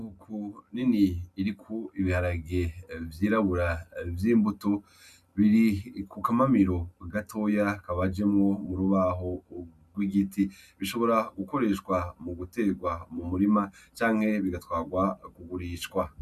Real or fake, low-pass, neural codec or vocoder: real; 14.4 kHz; none